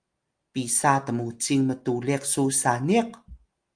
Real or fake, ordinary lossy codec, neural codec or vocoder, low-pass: real; Opus, 32 kbps; none; 9.9 kHz